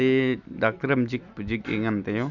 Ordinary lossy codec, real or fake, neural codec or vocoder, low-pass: none; real; none; 7.2 kHz